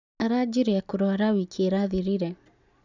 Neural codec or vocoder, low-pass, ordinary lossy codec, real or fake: none; 7.2 kHz; none; real